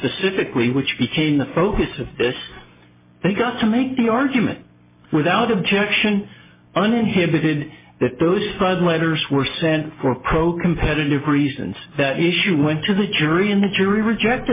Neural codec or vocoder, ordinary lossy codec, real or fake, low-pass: none; MP3, 16 kbps; real; 3.6 kHz